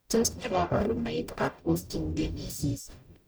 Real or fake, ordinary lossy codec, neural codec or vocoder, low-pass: fake; none; codec, 44.1 kHz, 0.9 kbps, DAC; none